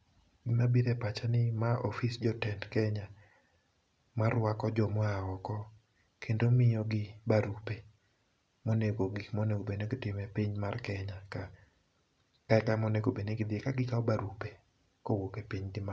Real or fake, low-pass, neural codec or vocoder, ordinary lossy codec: real; none; none; none